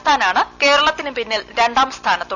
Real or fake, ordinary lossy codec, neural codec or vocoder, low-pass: real; none; none; 7.2 kHz